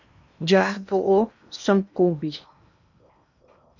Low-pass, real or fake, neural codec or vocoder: 7.2 kHz; fake; codec, 16 kHz in and 24 kHz out, 0.8 kbps, FocalCodec, streaming, 65536 codes